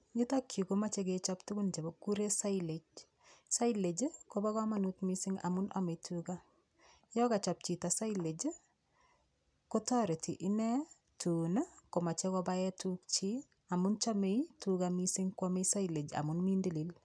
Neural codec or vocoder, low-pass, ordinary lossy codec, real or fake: none; 9.9 kHz; none; real